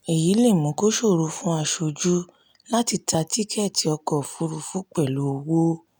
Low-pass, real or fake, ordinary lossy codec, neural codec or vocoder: none; real; none; none